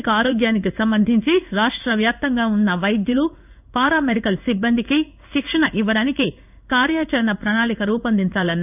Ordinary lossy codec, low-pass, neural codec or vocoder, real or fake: none; 3.6 kHz; codec, 16 kHz in and 24 kHz out, 1 kbps, XY-Tokenizer; fake